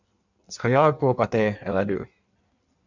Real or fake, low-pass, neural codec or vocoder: fake; 7.2 kHz; codec, 16 kHz in and 24 kHz out, 1.1 kbps, FireRedTTS-2 codec